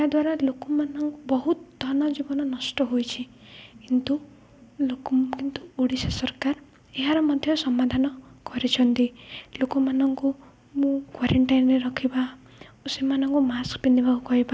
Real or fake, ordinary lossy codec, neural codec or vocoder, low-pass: real; none; none; none